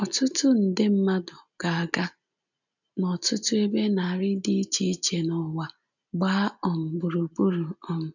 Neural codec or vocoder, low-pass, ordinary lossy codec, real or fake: none; 7.2 kHz; none; real